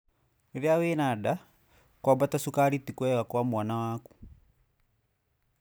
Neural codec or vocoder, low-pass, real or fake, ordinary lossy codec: none; none; real; none